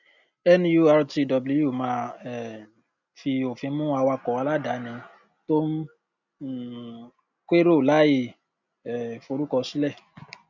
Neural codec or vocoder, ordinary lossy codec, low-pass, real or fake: none; none; 7.2 kHz; real